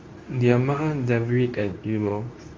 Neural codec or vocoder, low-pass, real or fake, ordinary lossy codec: codec, 24 kHz, 0.9 kbps, WavTokenizer, medium speech release version 2; 7.2 kHz; fake; Opus, 32 kbps